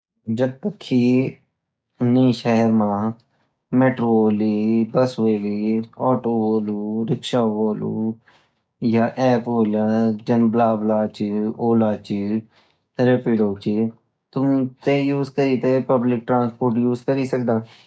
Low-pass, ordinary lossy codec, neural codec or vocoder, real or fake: none; none; codec, 16 kHz, 6 kbps, DAC; fake